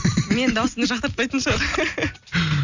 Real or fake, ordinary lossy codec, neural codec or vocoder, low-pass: real; none; none; 7.2 kHz